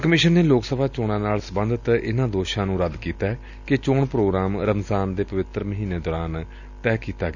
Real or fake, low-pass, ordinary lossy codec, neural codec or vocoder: real; 7.2 kHz; none; none